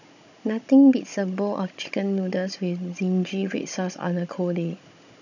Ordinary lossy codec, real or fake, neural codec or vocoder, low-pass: none; fake; codec, 16 kHz, 16 kbps, FunCodec, trained on Chinese and English, 50 frames a second; 7.2 kHz